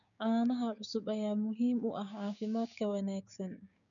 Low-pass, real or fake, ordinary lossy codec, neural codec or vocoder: 7.2 kHz; fake; none; codec, 16 kHz, 6 kbps, DAC